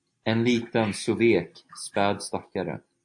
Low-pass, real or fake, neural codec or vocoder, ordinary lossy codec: 10.8 kHz; real; none; AAC, 64 kbps